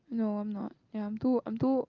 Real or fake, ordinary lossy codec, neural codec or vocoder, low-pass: real; Opus, 32 kbps; none; 7.2 kHz